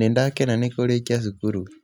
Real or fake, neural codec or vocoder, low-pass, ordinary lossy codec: real; none; 19.8 kHz; none